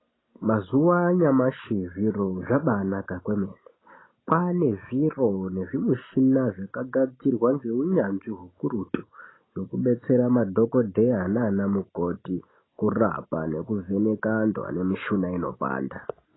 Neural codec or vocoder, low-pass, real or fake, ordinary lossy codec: none; 7.2 kHz; real; AAC, 16 kbps